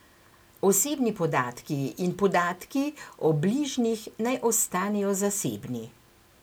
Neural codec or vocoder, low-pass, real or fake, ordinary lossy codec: none; none; real; none